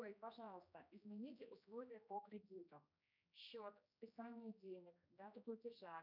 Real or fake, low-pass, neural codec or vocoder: fake; 5.4 kHz; codec, 16 kHz, 1 kbps, X-Codec, HuBERT features, trained on general audio